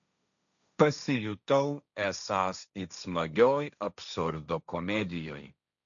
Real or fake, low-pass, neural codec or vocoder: fake; 7.2 kHz; codec, 16 kHz, 1.1 kbps, Voila-Tokenizer